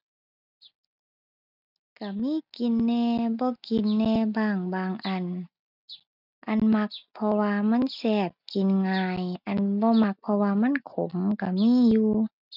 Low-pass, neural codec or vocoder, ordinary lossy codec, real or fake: 5.4 kHz; none; none; real